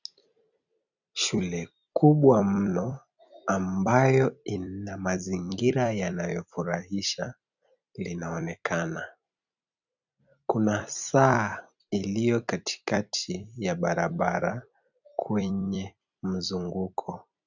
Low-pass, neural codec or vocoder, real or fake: 7.2 kHz; vocoder, 44.1 kHz, 128 mel bands every 256 samples, BigVGAN v2; fake